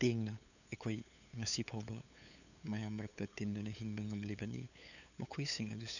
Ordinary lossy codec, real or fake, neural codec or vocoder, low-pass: none; fake; codec, 16 kHz, 8 kbps, FunCodec, trained on LibriTTS, 25 frames a second; 7.2 kHz